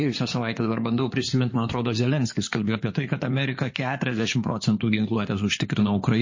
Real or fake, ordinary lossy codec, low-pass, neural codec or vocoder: fake; MP3, 32 kbps; 7.2 kHz; codec, 16 kHz in and 24 kHz out, 2.2 kbps, FireRedTTS-2 codec